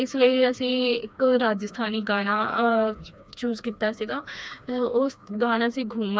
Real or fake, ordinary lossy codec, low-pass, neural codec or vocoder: fake; none; none; codec, 16 kHz, 2 kbps, FreqCodec, smaller model